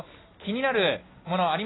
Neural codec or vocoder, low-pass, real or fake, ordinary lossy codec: none; 7.2 kHz; real; AAC, 16 kbps